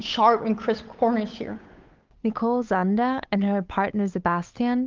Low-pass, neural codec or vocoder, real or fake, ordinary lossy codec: 7.2 kHz; codec, 16 kHz, 8 kbps, FunCodec, trained on Chinese and English, 25 frames a second; fake; Opus, 32 kbps